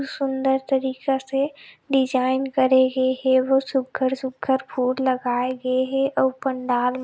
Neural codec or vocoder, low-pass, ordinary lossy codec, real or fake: none; none; none; real